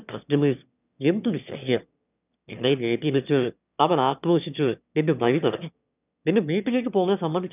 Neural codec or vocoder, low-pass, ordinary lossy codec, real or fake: autoencoder, 22.05 kHz, a latent of 192 numbers a frame, VITS, trained on one speaker; 3.6 kHz; none; fake